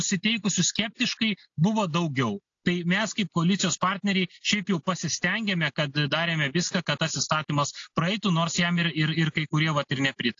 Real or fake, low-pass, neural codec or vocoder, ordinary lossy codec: real; 7.2 kHz; none; AAC, 48 kbps